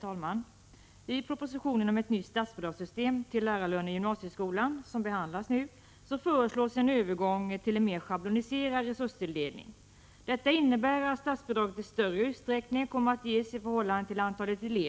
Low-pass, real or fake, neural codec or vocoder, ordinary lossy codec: none; real; none; none